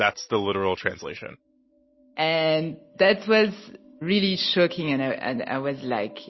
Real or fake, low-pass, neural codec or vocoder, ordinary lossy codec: real; 7.2 kHz; none; MP3, 24 kbps